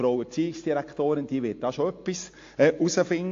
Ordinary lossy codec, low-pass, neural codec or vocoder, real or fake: AAC, 48 kbps; 7.2 kHz; none; real